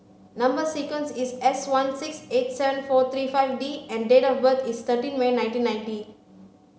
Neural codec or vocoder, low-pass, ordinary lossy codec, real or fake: none; none; none; real